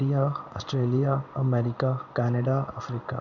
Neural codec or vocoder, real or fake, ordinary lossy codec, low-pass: codec, 16 kHz in and 24 kHz out, 1 kbps, XY-Tokenizer; fake; none; 7.2 kHz